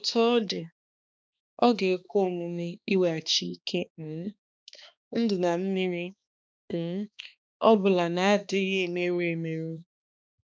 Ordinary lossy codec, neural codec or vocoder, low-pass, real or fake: none; codec, 16 kHz, 2 kbps, X-Codec, HuBERT features, trained on balanced general audio; none; fake